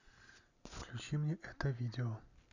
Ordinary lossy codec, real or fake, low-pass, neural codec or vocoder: none; real; 7.2 kHz; none